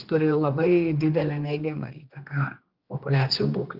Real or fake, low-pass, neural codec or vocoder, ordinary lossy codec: fake; 5.4 kHz; codec, 16 kHz, 2 kbps, X-Codec, HuBERT features, trained on general audio; Opus, 32 kbps